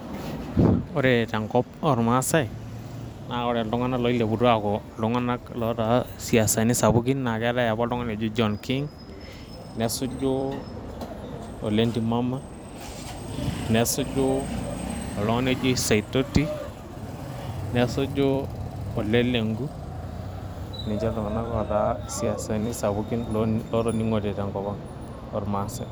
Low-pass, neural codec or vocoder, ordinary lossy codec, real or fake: none; vocoder, 44.1 kHz, 128 mel bands every 256 samples, BigVGAN v2; none; fake